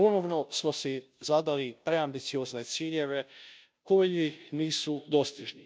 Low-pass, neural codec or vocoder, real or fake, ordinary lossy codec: none; codec, 16 kHz, 0.5 kbps, FunCodec, trained on Chinese and English, 25 frames a second; fake; none